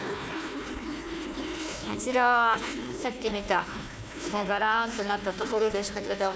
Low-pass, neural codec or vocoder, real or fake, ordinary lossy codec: none; codec, 16 kHz, 1 kbps, FunCodec, trained on Chinese and English, 50 frames a second; fake; none